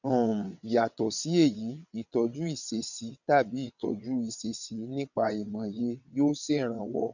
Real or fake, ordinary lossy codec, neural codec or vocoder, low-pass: fake; none; vocoder, 22.05 kHz, 80 mel bands, WaveNeXt; 7.2 kHz